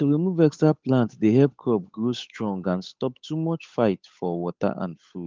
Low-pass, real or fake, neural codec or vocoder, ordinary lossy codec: 7.2 kHz; fake; codec, 16 kHz, 8 kbps, FunCodec, trained on Chinese and English, 25 frames a second; Opus, 32 kbps